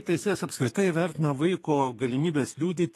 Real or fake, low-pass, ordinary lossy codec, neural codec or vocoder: fake; 14.4 kHz; AAC, 48 kbps; codec, 32 kHz, 1.9 kbps, SNAC